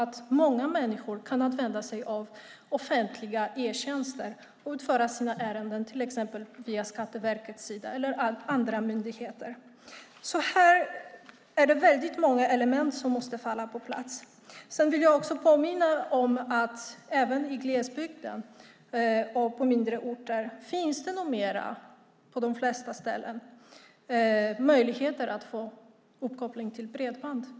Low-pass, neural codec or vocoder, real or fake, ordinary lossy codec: none; none; real; none